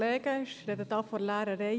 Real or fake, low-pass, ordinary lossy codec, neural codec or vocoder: real; none; none; none